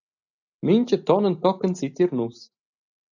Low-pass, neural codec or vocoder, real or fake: 7.2 kHz; none; real